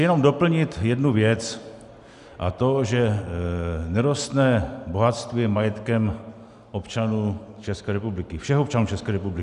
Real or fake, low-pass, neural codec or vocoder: real; 10.8 kHz; none